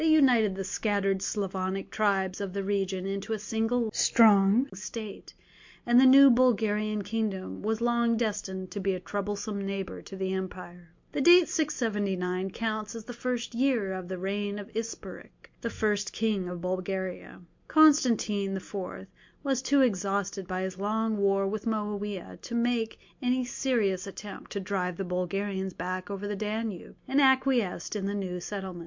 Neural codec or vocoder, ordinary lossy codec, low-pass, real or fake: none; MP3, 48 kbps; 7.2 kHz; real